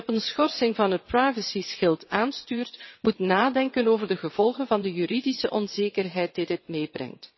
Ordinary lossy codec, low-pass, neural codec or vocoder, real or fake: MP3, 24 kbps; 7.2 kHz; vocoder, 22.05 kHz, 80 mel bands, WaveNeXt; fake